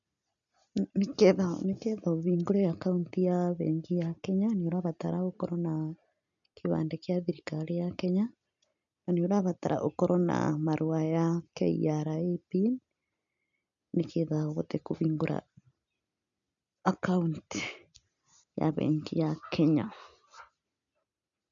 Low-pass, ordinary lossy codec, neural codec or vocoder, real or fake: 7.2 kHz; MP3, 96 kbps; none; real